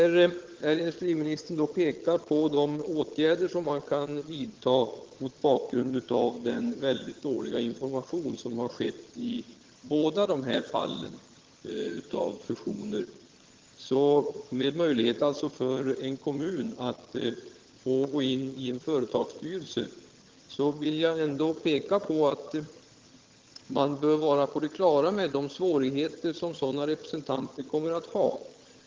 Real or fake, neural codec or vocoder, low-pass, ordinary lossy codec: fake; vocoder, 22.05 kHz, 80 mel bands, HiFi-GAN; 7.2 kHz; Opus, 16 kbps